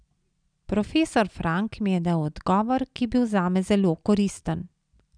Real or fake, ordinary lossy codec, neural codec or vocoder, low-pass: real; none; none; 9.9 kHz